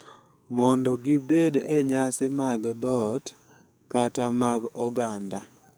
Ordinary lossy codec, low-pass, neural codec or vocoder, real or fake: none; none; codec, 44.1 kHz, 2.6 kbps, SNAC; fake